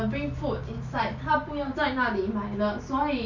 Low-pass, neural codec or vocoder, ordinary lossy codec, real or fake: 7.2 kHz; none; none; real